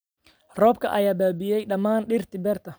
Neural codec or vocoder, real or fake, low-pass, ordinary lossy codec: none; real; none; none